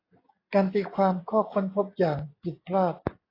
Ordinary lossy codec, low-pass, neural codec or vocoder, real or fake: AAC, 24 kbps; 5.4 kHz; none; real